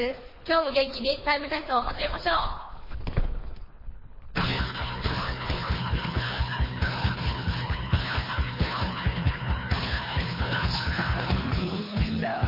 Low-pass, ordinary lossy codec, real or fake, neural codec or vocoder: 5.4 kHz; MP3, 24 kbps; fake; codec, 24 kHz, 3 kbps, HILCodec